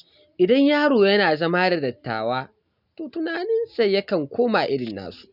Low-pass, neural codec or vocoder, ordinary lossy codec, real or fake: 5.4 kHz; none; none; real